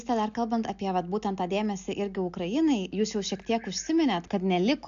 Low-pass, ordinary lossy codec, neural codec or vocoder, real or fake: 7.2 kHz; AAC, 96 kbps; none; real